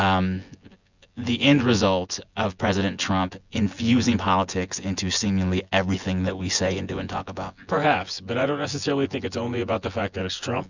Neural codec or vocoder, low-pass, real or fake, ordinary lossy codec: vocoder, 24 kHz, 100 mel bands, Vocos; 7.2 kHz; fake; Opus, 64 kbps